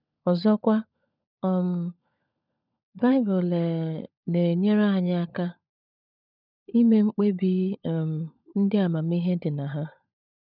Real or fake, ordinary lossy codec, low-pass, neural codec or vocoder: fake; none; 5.4 kHz; codec, 16 kHz, 16 kbps, FunCodec, trained on LibriTTS, 50 frames a second